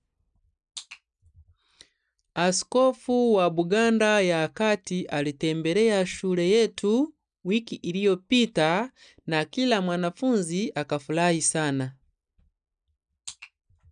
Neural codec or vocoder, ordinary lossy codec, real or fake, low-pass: none; none; real; 9.9 kHz